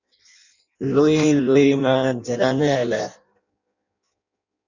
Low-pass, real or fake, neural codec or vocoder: 7.2 kHz; fake; codec, 16 kHz in and 24 kHz out, 0.6 kbps, FireRedTTS-2 codec